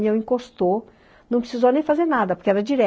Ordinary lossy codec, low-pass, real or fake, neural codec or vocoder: none; none; real; none